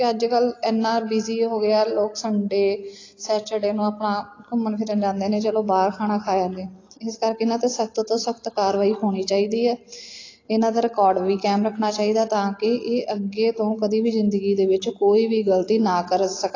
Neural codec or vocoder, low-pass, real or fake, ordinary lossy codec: none; 7.2 kHz; real; AAC, 32 kbps